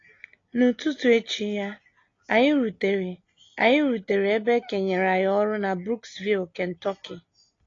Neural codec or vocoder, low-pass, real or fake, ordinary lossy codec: none; 7.2 kHz; real; AAC, 32 kbps